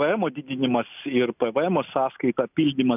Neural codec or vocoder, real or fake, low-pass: none; real; 3.6 kHz